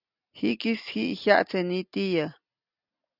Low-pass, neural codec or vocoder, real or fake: 5.4 kHz; none; real